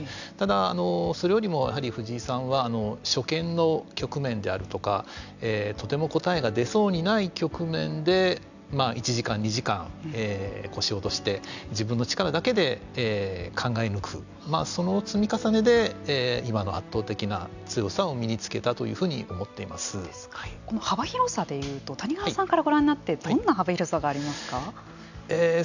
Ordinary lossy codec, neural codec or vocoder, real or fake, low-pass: none; none; real; 7.2 kHz